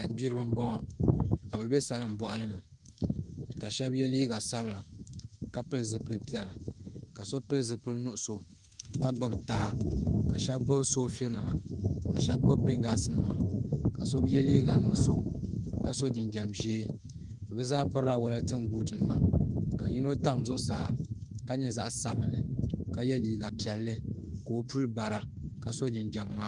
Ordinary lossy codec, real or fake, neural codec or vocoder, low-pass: Opus, 32 kbps; fake; autoencoder, 48 kHz, 32 numbers a frame, DAC-VAE, trained on Japanese speech; 10.8 kHz